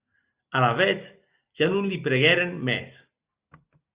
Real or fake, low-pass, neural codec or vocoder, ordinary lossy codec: real; 3.6 kHz; none; Opus, 64 kbps